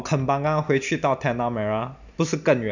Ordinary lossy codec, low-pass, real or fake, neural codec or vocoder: none; 7.2 kHz; real; none